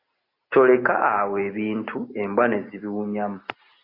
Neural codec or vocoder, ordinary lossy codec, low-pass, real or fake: none; AAC, 32 kbps; 5.4 kHz; real